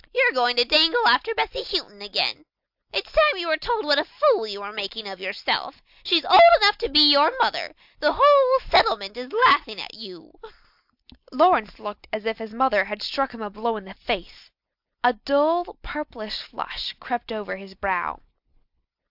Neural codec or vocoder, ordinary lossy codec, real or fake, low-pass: none; AAC, 48 kbps; real; 5.4 kHz